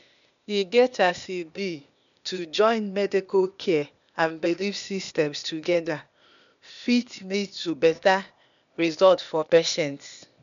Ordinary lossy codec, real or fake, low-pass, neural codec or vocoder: none; fake; 7.2 kHz; codec, 16 kHz, 0.8 kbps, ZipCodec